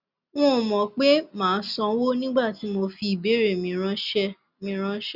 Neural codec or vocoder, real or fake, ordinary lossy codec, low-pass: none; real; Opus, 64 kbps; 5.4 kHz